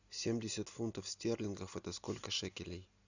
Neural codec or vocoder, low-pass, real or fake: none; 7.2 kHz; real